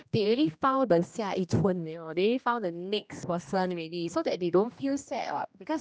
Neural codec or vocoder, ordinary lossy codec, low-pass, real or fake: codec, 16 kHz, 1 kbps, X-Codec, HuBERT features, trained on general audio; none; none; fake